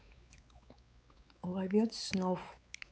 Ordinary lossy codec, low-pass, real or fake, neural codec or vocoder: none; none; fake; codec, 16 kHz, 4 kbps, X-Codec, WavLM features, trained on Multilingual LibriSpeech